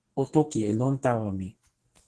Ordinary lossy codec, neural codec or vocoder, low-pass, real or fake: Opus, 16 kbps; codec, 44.1 kHz, 2.6 kbps, SNAC; 10.8 kHz; fake